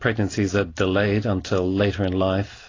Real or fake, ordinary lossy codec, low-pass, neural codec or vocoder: real; AAC, 32 kbps; 7.2 kHz; none